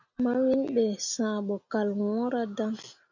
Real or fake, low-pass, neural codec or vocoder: real; 7.2 kHz; none